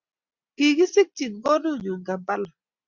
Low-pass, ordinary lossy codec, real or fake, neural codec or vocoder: 7.2 kHz; Opus, 64 kbps; real; none